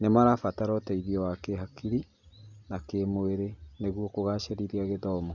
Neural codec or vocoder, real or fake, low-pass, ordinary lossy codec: none; real; 7.2 kHz; none